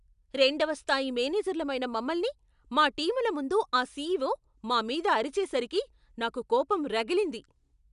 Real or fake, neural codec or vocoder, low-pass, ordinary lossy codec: real; none; 10.8 kHz; none